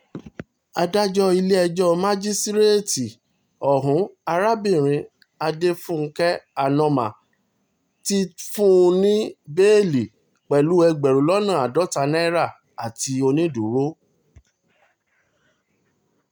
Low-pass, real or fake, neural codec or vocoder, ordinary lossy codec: none; real; none; none